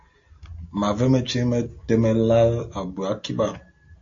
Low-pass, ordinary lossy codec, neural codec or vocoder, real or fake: 7.2 kHz; AAC, 48 kbps; none; real